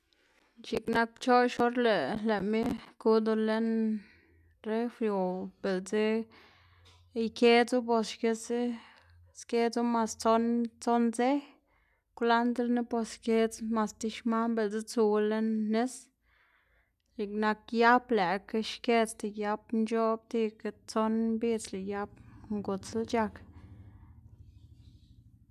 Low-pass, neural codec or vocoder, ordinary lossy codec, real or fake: 14.4 kHz; none; none; real